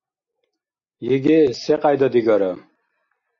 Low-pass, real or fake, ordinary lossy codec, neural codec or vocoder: 7.2 kHz; real; AAC, 64 kbps; none